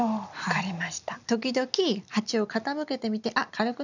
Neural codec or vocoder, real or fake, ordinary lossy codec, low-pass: none; real; none; 7.2 kHz